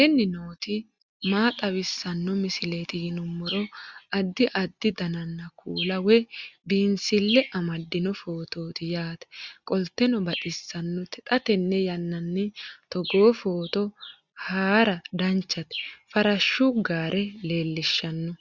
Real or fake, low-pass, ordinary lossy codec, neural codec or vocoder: real; 7.2 kHz; Opus, 64 kbps; none